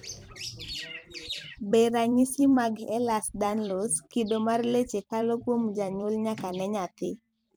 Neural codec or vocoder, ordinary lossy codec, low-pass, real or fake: vocoder, 44.1 kHz, 128 mel bands every 256 samples, BigVGAN v2; none; none; fake